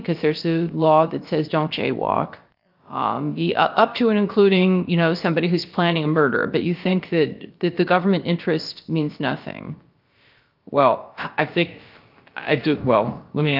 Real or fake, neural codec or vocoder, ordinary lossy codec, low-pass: fake; codec, 16 kHz, about 1 kbps, DyCAST, with the encoder's durations; Opus, 24 kbps; 5.4 kHz